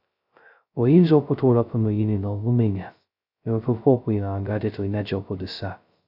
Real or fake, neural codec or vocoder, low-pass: fake; codec, 16 kHz, 0.2 kbps, FocalCodec; 5.4 kHz